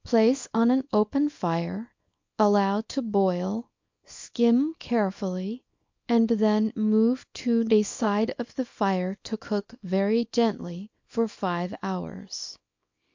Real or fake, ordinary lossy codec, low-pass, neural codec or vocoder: fake; MP3, 48 kbps; 7.2 kHz; codec, 24 kHz, 0.9 kbps, WavTokenizer, small release